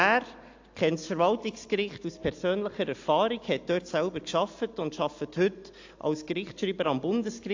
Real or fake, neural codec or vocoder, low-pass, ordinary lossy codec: real; none; 7.2 kHz; AAC, 48 kbps